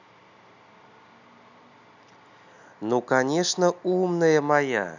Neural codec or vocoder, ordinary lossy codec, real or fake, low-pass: none; none; real; 7.2 kHz